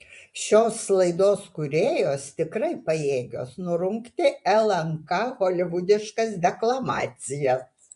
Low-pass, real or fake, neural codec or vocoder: 10.8 kHz; fake; vocoder, 24 kHz, 100 mel bands, Vocos